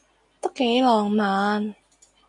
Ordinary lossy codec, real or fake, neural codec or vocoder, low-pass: MP3, 96 kbps; real; none; 10.8 kHz